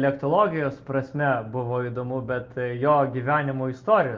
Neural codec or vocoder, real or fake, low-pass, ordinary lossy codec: none; real; 7.2 kHz; Opus, 24 kbps